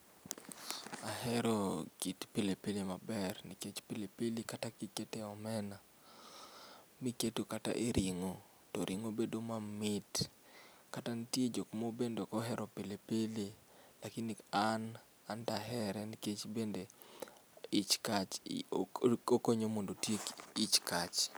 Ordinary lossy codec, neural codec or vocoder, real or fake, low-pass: none; none; real; none